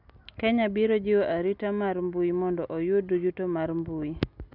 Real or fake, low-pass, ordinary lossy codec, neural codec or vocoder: real; 5.4 kHz; none; none